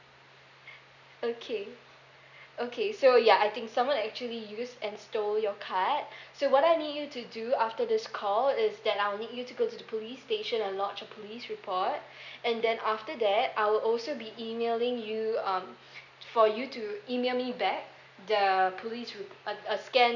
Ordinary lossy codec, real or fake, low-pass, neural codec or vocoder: none; real; 7.2 kHz; none